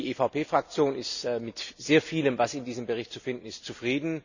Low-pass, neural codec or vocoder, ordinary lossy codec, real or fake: 7.2 kHz; none; none; real